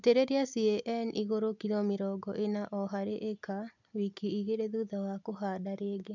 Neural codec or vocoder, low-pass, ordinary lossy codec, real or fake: none; 7.2 kHz; none; real